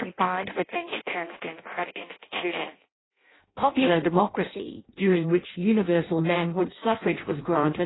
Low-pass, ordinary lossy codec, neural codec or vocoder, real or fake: 7.2 kHz; AAC, 16 kbps; codec, 16 kHz in and 24 kHz out, 0.6 kbps, FireRedTTS-2 codec; fake